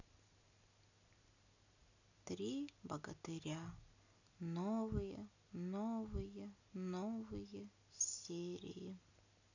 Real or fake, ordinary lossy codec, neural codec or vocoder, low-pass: real; none; none; 7.2 kHz